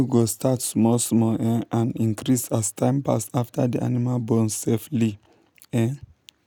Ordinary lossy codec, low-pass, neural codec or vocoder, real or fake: none; none; none; real